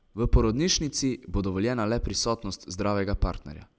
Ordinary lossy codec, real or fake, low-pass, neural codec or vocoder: none; real; none; none